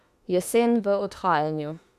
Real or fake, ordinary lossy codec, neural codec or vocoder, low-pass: fake; Opus, 64 kbps; autoencoder, 48 kHz, 32 numbers a frame, DAC-VAE, trained on Japanese speech; 14.4 kHz